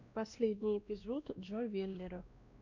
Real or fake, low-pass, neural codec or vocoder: fake; 7.2 kHz; codec, 16 kHz, 1 kbps, X-Codec, WavLM features, trained on Multilingual LibriSpeech